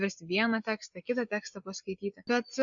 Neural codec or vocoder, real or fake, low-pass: none; real; 7.2 kHz